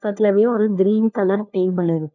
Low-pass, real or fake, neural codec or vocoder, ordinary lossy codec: 7.2 kHz; fake; codec, 16 kHz, 1 kbps, FunCodec, trained on LibriTTS, 50 frames a second; none